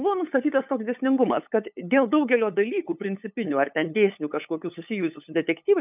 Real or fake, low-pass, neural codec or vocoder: fake; 3.6 kHz; codec, 16 kHz, 8 kbps, FunCodec, trained on LibriTTS, 25 frames a second